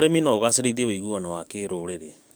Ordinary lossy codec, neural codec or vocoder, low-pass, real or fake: none; codec, 44.1 kHz, 7.8 kbps, DAC; none; fake